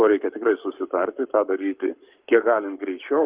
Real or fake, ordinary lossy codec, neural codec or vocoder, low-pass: fake; Opus, 24 kbps; codec, 44.1 kHz, 7.8 kbps, Pupu-Codec; 3.6 kHz